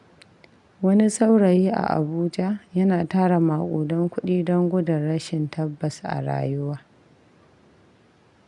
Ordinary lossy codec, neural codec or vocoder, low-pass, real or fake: none; none; 10.8 kHz; real